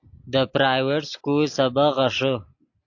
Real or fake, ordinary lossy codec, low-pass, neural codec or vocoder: real; AAC, 48 kbps; 7.2 kHz; none